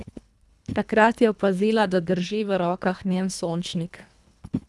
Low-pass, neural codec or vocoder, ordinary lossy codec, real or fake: none; codec, 24 kHz, 1.5 kbps, HILCodec; none; fake